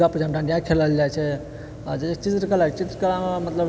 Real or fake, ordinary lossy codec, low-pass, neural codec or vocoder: real; none; none; none